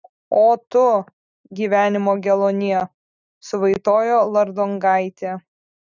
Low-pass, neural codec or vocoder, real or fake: 7.2 kHz; none; real